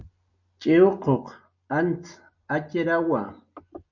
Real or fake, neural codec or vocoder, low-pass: real; none; 7.2 kHz